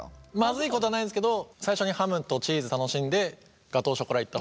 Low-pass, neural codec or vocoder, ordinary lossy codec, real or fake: none; none; none; real